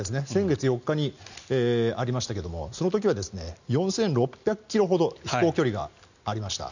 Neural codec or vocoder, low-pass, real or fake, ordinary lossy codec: none; 7.2 kHz; real; none